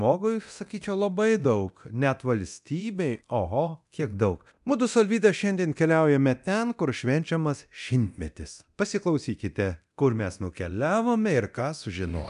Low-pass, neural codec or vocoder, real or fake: 10.8 kHz; codec, 24 kHz, 0.9 kbps, DualCodec; fake